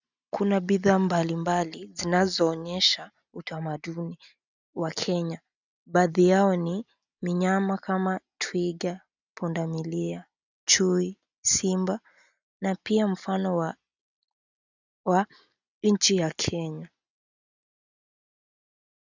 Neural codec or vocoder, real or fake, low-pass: none; real; 7.2 kHz